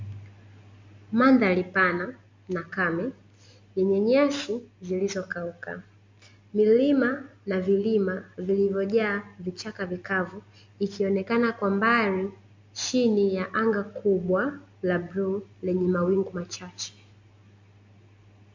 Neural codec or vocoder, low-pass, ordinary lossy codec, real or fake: none; 7.2 kHz; MP3, 48 kbps; real